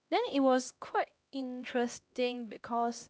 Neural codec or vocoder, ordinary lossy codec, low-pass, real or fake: codec, 16 kHz, 1 kbps, X-Codec, HuBERT features, trained on LibriSpeech; none; none; fake